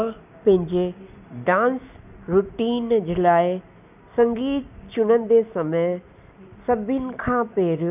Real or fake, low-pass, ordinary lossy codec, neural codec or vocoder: real; 3.6 kHz; none; none